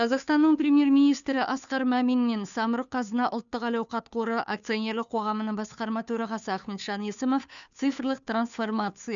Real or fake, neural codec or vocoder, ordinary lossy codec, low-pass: fake; codec, 16 kHz, 2 kbps, FunCodec, trained on Chinese and English, 25 frames a second; none; 7.2 kHz